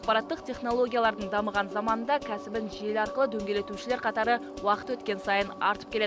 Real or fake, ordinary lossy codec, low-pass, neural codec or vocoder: real; none; none; none